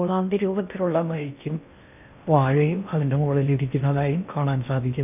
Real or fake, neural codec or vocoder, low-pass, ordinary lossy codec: fake; codec, 16 kHz in and 24 kHz out, 0.6 kbps, FocalCodec, streaming, 2048 codes; 3.6 kHz; none